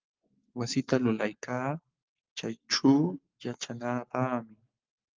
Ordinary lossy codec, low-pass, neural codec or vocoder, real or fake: Opus, 32 kbps; 7.2 kHz; codec, 44.1 kHz, 7.8 kbps, Pupu-Codec; fake